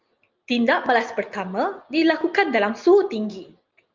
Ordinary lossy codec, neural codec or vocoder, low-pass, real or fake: Opus, 24 kbps; none; 7.2 kHz; real